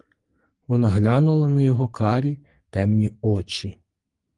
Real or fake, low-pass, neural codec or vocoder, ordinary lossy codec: fake; 10.8 kHz; codec, 44.1 kHz, 2.6 kbps, SNAC; Opus, 24 kbps